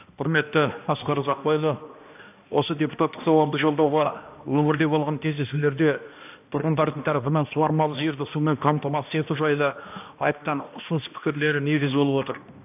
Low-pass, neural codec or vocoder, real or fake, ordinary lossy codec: 3.6 kHz; codec, 16 kHz, 1 kbps, X-Codec, HuBERT features, trained on balanced general audio; fake; AAC, 32 kbps